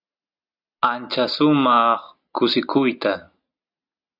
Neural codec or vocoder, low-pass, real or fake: none; 5.4 kHz; real